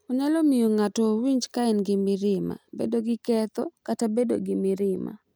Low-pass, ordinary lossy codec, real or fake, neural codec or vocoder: none; none; real; none